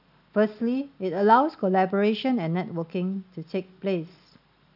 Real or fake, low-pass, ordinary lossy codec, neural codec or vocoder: real; 5.4 kHz; none; none